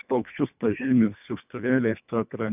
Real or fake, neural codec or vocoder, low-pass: fake; codec, 24 kHz, 1.5 kbps, HILCodec; 3.6 kHz